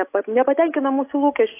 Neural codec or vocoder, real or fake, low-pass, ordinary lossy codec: none; real; 3.6 kHz; AAC, 24 kbps